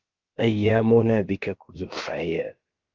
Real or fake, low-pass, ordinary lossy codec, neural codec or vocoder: fake; 7.2 kHz; Opus, 32 kbps; codec, 16 kHz, about 1 kbps, DyCAST, with the encoder's durations